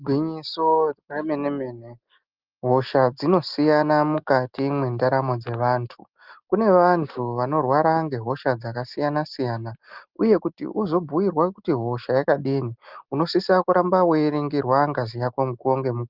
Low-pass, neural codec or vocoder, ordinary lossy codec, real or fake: 5.4 kHz; none; Opus, 32 kbps; real